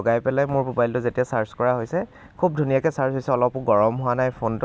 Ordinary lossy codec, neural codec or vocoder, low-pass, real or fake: none; none; none; real